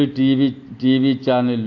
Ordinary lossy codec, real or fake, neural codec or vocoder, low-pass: AAC, 48 kbps; real; none; 7.2 kHz